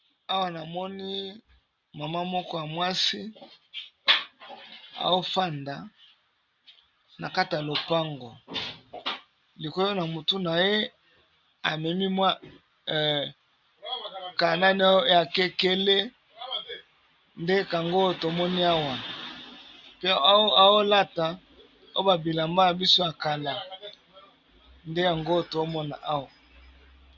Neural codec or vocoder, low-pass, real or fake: none; 7.2 kHz; real